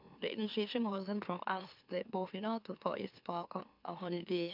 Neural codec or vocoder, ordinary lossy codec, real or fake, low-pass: autoencoder, 44.1 kHz, a latent of 192 numbers a frame, MeloTTS; none; fake; 5.4 kHz